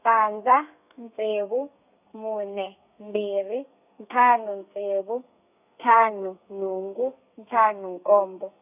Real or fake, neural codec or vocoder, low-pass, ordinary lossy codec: fake; codec, 32 kHz, 1.9 kbps, SNAC; 3.6 kHz; none